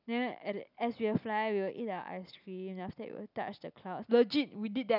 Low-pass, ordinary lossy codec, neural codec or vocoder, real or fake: 5.4 kHz; none; none; real